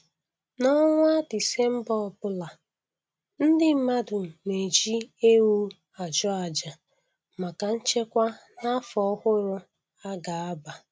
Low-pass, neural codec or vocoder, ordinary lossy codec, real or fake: none; none; none; real